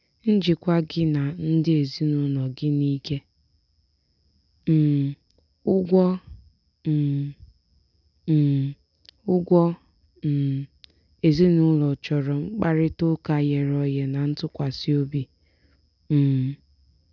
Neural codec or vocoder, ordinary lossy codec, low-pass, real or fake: none; none; 7.2 kHz; real